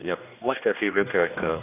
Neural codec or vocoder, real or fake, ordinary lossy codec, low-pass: codec, 16 kHz, 1 kbps, X-Codec, HuBERT features, trained on general audio; fake; none; 3.6 kHz